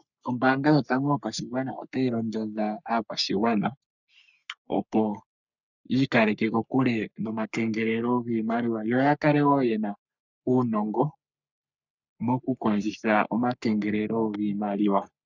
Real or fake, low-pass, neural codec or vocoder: fake; 7.2 kHz; codec, 44.1 kHz, 3.4 kbps, Pupu-Codec